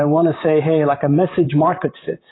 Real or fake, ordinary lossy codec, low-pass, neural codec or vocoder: fake; AAC, 16 kbps; 7.2 kHz; vocoder, 44.1 kHz, 128 mel bands, Pupu-Vocoder